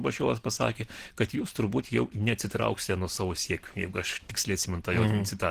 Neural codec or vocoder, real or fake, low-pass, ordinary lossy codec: none; real; 14.4 kHz; Opus, 16 kbps